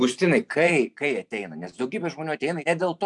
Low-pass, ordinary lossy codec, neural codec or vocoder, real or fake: 10.8 kHz; MP3, 96 kbps; vocoder, 44.1 kHz, 128 mel bands every 512 samples, BigVGAN v2; fake